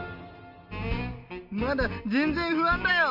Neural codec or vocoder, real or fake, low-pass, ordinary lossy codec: none; real; 5.4 kHz; none